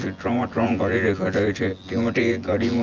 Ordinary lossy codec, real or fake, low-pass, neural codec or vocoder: Opus, 32 kbps; fake; 7.2 kHz; vocoder, 24 kHz, 100 mel bands, Vocos